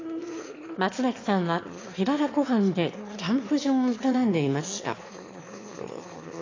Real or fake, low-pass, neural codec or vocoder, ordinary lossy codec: fake; 7.2 kHz; autoencoder, 22.05 kHz, a latent of 192 numbers a frame, VITS, trained on one speaker; AAC, 32 kbps